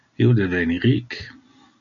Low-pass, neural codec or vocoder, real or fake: 7.2 kHz; none; real